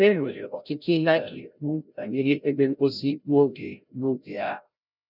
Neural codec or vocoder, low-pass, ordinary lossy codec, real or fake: codec, 16 kHz, 0.5 kbps, FreqCodec, larger model; 5.4 kHz; none; fake